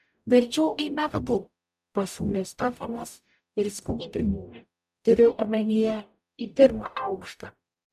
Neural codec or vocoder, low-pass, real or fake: codec, 44.1 kHz, 0.9 kbps, DAC; 14.4 kHz; fake